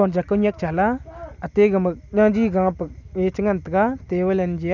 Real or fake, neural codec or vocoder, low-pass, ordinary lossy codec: real; none; 7.2 kHz; none